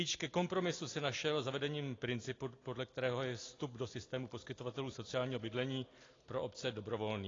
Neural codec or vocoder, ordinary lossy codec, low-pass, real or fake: none; AAC, 32 kbps; 7.2 kHz; real